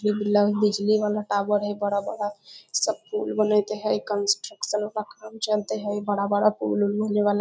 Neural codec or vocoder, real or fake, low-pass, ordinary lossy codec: none; real; none; none